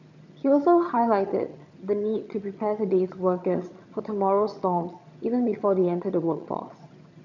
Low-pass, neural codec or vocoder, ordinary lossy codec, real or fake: 7.2 kHz; vocoder, 22.05 kHz, 80 mel bands, HiFi-GAN; none; fake